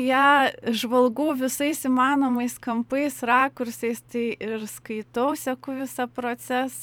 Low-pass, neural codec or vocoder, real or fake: 19.8 kHz; vocoder, 44.1 kHz, 128 mel bands every 512 samples, BigVGAN v2; fake